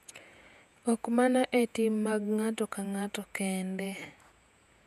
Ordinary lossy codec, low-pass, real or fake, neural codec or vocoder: none; 14.4 kHz; fake; vocoder, 48 kHz, 128 mel bands, Vocos